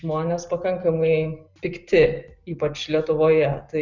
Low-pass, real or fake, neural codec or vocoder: 7.2 kHz; real; none